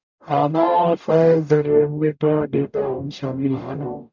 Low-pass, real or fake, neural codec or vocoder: 7.2 kHz; fake; codec, 44.1 kHz, 0.9 kbps, DAC